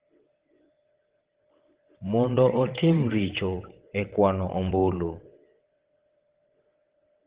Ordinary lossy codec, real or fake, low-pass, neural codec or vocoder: Opus, 16 kbps; fake; 3.6 kHz; vocoder, 22.05 kHz, 80 mel bands, WaveNeXt